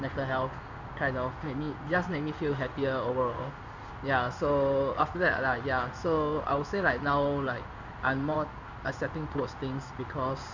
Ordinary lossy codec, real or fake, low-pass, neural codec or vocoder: none; fake; 7.2 kHz; codec, 16 kHz in and 24 kHz out, 1 kbps, XY-Tokenizer